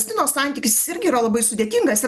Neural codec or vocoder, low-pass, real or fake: none; 14.4 kHz; real